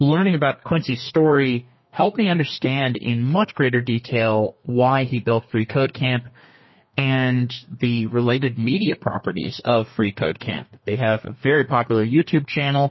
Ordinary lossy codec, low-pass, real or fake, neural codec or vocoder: MP3, 24 kbps; 7.2 kHz; fake; codec, 32 kHz, 1.9 kbps, SNAC